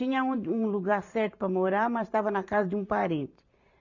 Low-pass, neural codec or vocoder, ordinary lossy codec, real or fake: 7.2 kHz; vocoder, 44.1 kHz, 128 mel bands every 512 samples, BigVGAN v2; none; fake